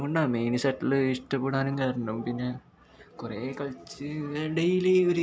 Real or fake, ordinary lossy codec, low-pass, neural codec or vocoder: real; none; none; none